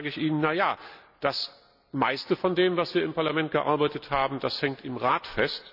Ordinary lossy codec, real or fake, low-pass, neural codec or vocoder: none; real; 5.4 kHz; none